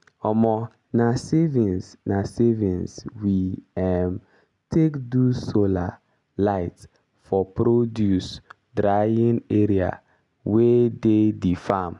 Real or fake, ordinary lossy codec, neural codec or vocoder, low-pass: real; none; none; 10.8 kHz